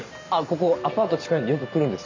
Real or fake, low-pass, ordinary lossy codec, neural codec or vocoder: real; 7.2 kHz; MP3, 64 kbps; none